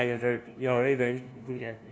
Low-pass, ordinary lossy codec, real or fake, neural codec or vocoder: none; none; fake; codec, 16 kHz, 0.5 kbps, FunCodec, trained on LibriTTS, 25 frames a second